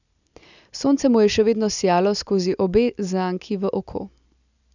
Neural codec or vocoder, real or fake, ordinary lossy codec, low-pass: none; real; none; 7.2 kHz